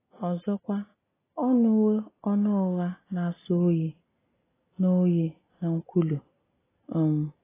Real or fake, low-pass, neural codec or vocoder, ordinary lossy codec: real; 3.6 kHz; none; AAC, 16 kbps